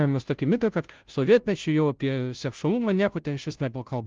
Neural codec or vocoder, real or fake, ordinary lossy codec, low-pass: codec, 16 kHz, 0.5 kbps, FunCodec, trained on Chinese and English, 25 frames a second; fake; Opus, 32 kbps; 7.2 kHz